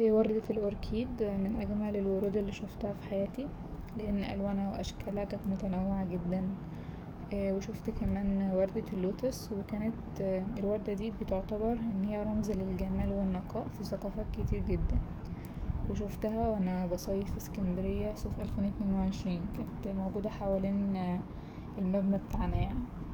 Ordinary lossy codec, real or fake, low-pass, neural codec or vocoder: none; fake; none; codec, 44.1 kHz, 7.8 kbps, DAC